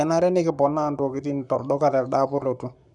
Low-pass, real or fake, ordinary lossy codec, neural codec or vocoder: 10.8 kHz; fake; none; codec, 44.1 kHz, 7.8 kbps, Pupu-Codec